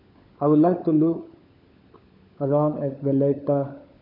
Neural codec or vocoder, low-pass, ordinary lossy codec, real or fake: codec, 16 kHz, 16 kbps, FunCodec, trained on LibriTTS, 50 frames a second; 5.4 kHz; none; fake